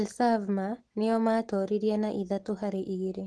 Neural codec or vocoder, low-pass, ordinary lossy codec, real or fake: none; 10.8 kHz; Opus, 16 kbps; real